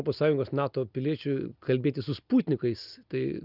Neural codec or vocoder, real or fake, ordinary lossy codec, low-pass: none; real; Opus, 24 kbps; 5.4 kHz